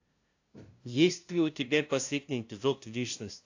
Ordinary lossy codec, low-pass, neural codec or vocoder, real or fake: AAC, 48 kbps; 7.2 kHz; codec, 16 kHz, 0.5 kbps, FunCodec, trained on LibriTTS, 25 frames a second; fake